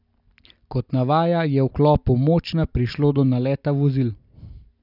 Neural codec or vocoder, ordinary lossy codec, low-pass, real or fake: none; none; 5.4 kHz; real